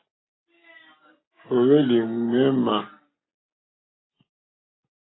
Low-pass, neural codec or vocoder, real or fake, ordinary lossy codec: 7.2 kHz; none; real; AAC, 16 kbps